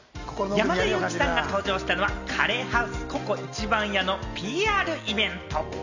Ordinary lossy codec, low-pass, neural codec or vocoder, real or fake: none; 7.2 kHz; none; real